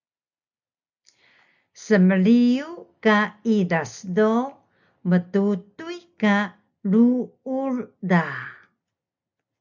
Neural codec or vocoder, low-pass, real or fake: none; 7.2 kHz; real